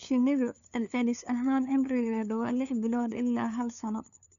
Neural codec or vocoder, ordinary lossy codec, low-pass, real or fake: codec, 16 kHz, 2 kbps, FunCodec, trained on LibriTTS, 25 frames a second; none; 7.2 kHz; fake